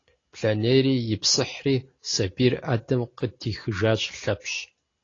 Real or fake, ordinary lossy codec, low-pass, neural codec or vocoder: real; AAC, 32 kbps; 7.2 kHz; none